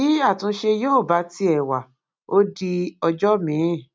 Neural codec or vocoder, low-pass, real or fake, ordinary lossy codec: none; none; real; none